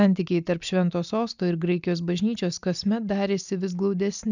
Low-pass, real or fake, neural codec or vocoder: 7.2 kHz; real; none